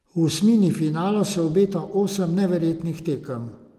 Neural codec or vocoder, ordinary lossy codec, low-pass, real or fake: none; Opus, 24 kbps; 14.4 kHz; real